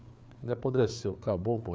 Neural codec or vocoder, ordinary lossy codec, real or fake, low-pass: codec, 16 kHz, 4 kbps, FreqCodec, larger model; none; fake; none